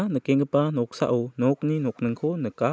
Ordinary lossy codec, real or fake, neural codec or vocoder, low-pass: none; real; none; none